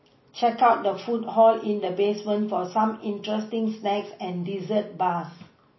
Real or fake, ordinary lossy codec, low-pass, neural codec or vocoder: real; MP3, 24 kbps; 7.2 kHz; none